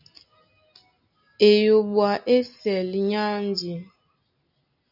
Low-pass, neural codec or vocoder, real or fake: 5.4 kHz; none; real